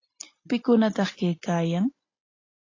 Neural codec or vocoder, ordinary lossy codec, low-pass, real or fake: none; AAC, 32 kbps; 7.2 kHz; real